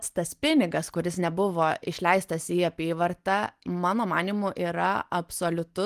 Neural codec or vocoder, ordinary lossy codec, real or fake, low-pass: none; Opus, 24 kbps; real; 14.4 kHz